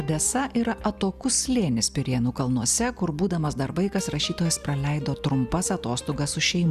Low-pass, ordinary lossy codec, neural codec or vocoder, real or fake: 14.4 kHz; Opus, 64 kbps; none; real